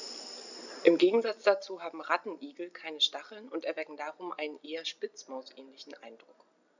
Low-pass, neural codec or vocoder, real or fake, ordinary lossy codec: 7.2 kHz; none; real; none